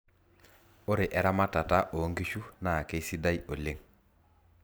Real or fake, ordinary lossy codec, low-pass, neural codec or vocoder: real; none; none; none